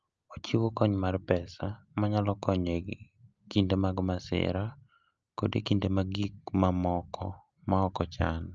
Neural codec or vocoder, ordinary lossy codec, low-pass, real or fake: none; Opus, 24 kbps; 7.2 kHz; real